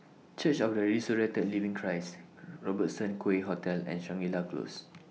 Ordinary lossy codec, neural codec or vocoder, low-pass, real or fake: none; none; none; real